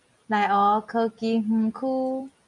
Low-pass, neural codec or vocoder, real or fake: 10.8 kHz; none; real